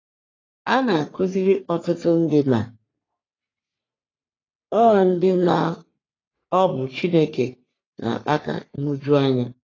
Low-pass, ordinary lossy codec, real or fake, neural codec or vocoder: 7.2 kHz; AAC, 32 kbps; fake; codec, 44.1 kHz, 3.4 kbps, Pupu-Codec